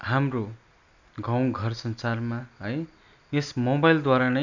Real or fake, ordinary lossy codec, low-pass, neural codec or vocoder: real; none; 7.2 kHz; none